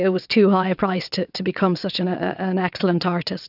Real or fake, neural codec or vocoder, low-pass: fake; vocoder, 22.05 kHz, 80 mel bands, WaveNeXt; 5.4 kHz